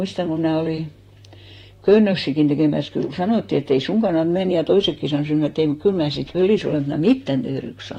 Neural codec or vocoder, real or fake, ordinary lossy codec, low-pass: vocoder, 44.1 kHz, 128 mel bands, Pupu-Vocoder; fake; AAC, 48 kbps; 19.8 kHz